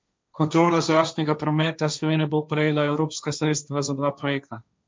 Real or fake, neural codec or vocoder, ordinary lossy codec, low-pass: fake; codec, 16 kHz, 1.1 kbps, Voila-Tokenizer; none; none